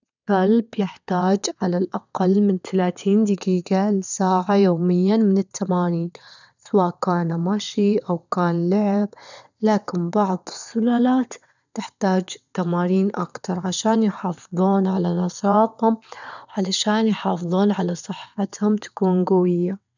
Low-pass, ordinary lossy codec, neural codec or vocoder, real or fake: 7.2 kHz; none; vocoder, 22.05 kHz, 80 mel bands, WaveNeXt; fake